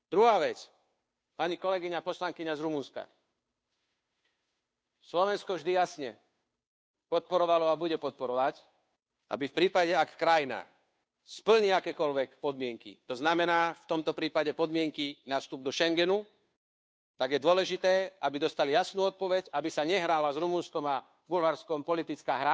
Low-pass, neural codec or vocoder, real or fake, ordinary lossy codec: none; codec, 16 kHz, 2 kbps, FunCodec, trained on Chinese and English, 25 frames a second; fake; none